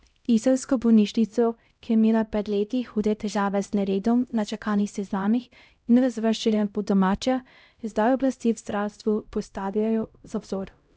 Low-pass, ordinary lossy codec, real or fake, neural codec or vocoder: none; none; fake; codec, 16 kHz, 0.5 kbps, X-Codec, HuBERT features, trained on LibriSpeech